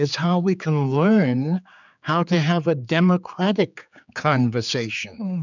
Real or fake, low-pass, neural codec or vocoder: fake; 7.2 kHz; codec, 16 kHz, 4 kbps, X-Codec, HuBERT features, trained on general audio